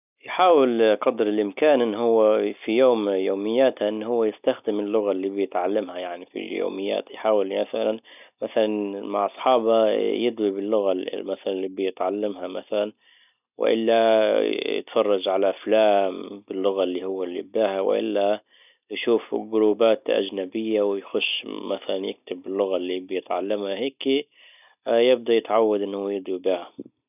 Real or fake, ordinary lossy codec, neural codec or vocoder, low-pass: real; none; none; 3.6 kHz